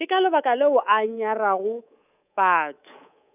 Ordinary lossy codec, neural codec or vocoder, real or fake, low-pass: none; codec, 24 kHz, 3.1 kbps, DualCodec; fake; 3.6 kHz